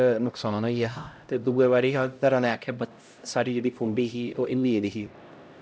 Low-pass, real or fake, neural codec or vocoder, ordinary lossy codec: none; fake; codec, 16 kHz, 0.5 kbps, X-Codec, HuBERT features, trained on LibriSpeech; none